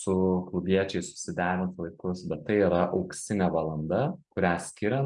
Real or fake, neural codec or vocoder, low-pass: real; none; 10.8 kHz